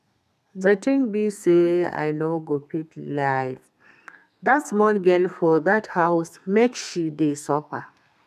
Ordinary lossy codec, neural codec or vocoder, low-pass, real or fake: none; codec, 32 kHz, 1.9 kbps, SNAC; 14.4 kHz; fake